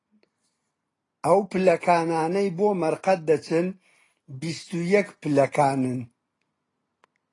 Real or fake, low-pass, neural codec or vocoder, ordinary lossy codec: real; 10.8 kHz; none; AAC, 32 kbps